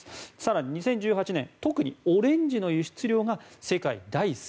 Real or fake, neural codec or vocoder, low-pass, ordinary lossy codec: real; none; none; none